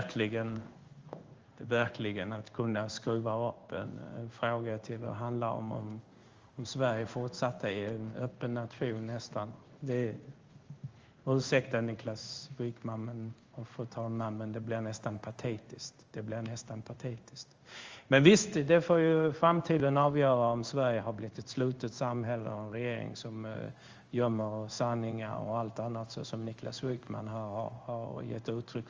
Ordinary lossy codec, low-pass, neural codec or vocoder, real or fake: Opus, 32 kbps; 7.2 kHz; codec, 16 kHz in and 24 kHz out, 1 kbps, XY-Tokenizer; fake